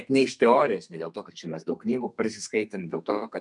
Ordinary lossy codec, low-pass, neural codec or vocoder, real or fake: MP3, 96 kbps; 10.8 kHz; codec, 32 kHz, 1.9 kbps, SNAC; fake